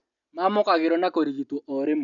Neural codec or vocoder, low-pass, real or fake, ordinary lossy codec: none; 7.2 kHz; real; none